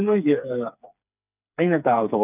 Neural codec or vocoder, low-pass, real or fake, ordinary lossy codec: codec, 16 kHz, 4 kbps, FreqCodec, smaller model; 3.6 kHz; fake; none